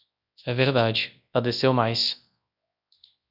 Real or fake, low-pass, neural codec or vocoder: fake; 5.4 kHz; codec, 24 kHz, 0.9 kbps, WavTokenizer, large speech release